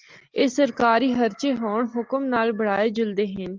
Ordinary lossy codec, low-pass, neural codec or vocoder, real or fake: Opus, 32 kbps; 7.2 kHz; none; real